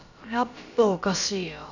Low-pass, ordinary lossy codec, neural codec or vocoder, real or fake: 7.2 kHz; none; codec, 16 kHz, about 1 kbps, DyCAST, with the encoder's durations; fake